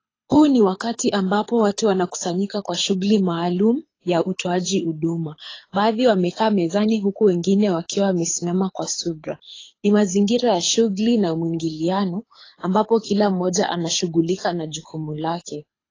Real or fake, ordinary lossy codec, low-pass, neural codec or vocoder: fake; AAC, 32 kbps; 7.2 kHz; codec, 24 kHz, 6 kbps, HILCodec